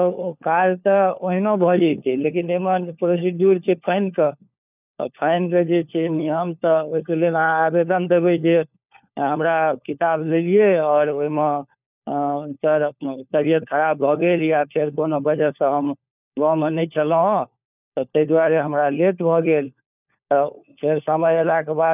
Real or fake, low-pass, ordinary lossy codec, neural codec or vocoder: fake; 3.6 kHz; none; codec, 16 kHz, 4 kbps, FunCodec, trained on LibriTTS, 50 frames a second